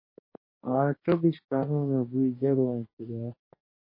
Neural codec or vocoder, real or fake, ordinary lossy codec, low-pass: codec, 44.1 kHz, 2.6 kbps, DAC; fake; MP3, 24 kbps; 5.4 kHz